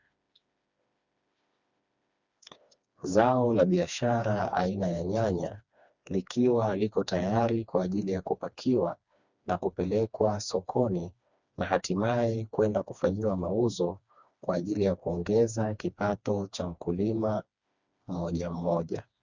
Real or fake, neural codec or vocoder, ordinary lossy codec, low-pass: fake; codec, 16 kHz, 2 kbps, FreqCodec, smaller model; Opus, 64 kbps; 7.2 kHz